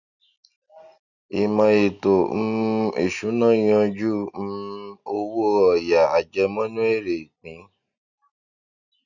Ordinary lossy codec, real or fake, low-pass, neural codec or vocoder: none; real; 7.2 kHz; none